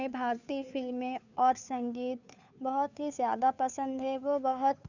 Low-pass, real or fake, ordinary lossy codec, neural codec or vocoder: 7.2 kHz; fake; none; codec, 16 kHz, 2 kbps, FunCodec, trained on Chinese and English, 25 frames a second